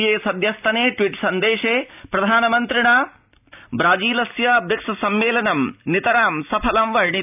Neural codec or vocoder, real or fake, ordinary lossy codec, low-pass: none; real; none; 3.6 kHz